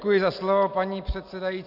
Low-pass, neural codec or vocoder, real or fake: 5.4 kHz; none; real